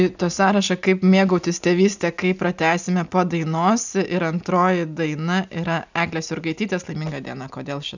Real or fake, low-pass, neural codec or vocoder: real; 7.2 kHz; none